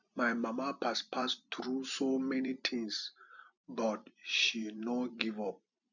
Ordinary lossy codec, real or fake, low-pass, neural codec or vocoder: none; fake; 7.2 kHz; vocoder, 44.1 kHz, 128 mel bands every 256 samples, BigVGAN v2